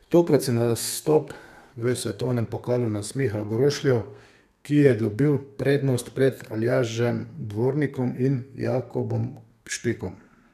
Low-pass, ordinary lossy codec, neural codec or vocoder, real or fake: 14.4 kHz; none; codec, 32 kHz, 1.9 kbps, SNAC; fake